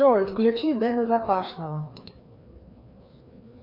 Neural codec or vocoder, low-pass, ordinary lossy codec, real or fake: codec, 16 kHz, 2 kbps, FreqCodec, larger model; 5.4 kHz; MP3, 48 kbps; fake